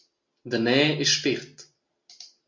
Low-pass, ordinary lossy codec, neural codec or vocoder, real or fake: 7.2 kHz; MP3, 64 kbps; none; real